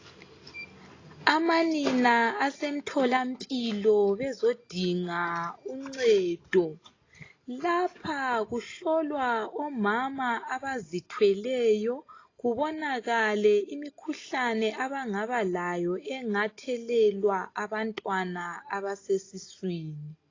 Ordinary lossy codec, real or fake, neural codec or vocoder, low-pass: AAC, 32 kbps; real; none; 7.2 kHz